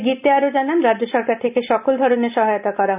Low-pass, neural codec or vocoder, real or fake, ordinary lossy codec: 3.6 kHz; none; real; none